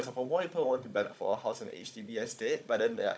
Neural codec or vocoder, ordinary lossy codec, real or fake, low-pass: codec, 16 kHz, 16 kbps, FunCodec, trained on Chinese and English, 50 frames a second; none; fake; none